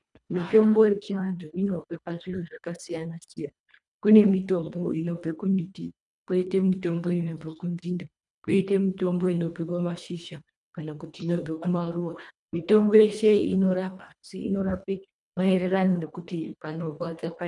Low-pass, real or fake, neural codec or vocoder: 10.8 kHz; fake; codec, 24 kHz, 1.5 kbps, HILCodec